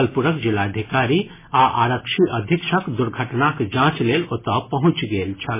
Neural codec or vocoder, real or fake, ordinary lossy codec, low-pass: none; real; MP3, 16 kbps; 3.6 kHz